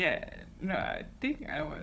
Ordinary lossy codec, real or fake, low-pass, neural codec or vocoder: none; fake; none; codec, 16 kHz, 16 kbps, FunCodec, trained on Chinese and English, 50 frames a second